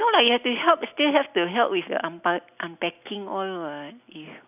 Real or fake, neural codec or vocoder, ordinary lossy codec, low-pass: real; none; none; 3.6 kHz